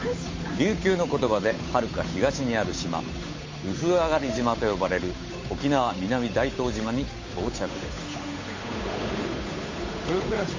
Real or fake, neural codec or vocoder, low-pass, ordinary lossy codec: fake; codec, 16 kHz, 8 kbps, FunCodec, trained on Chinese and English, 25 frames a second; 7.2 kHz; MP3, 32 kbps